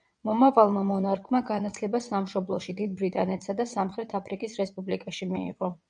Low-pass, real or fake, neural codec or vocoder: 9.9 kHz; fake; vocoder, 22.05 kHz, 80 mel bands, WaveNeXt